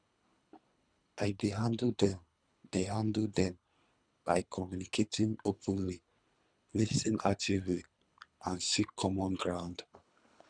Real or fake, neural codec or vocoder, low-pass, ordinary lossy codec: fake; codec, 24 kHz, 3 kbps, HILCodec; 9.9 kHz; none